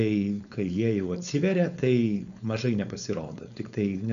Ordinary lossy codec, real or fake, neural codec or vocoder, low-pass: MP3, 96 kbps; fake; codec, 16 kHz, 4.8 kbps, FACodec; 7.2 kHz